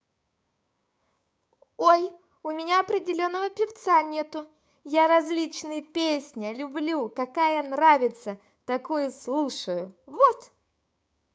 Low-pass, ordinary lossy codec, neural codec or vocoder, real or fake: none; none; codec, 16 kHz, 6 kbps, DAC; fake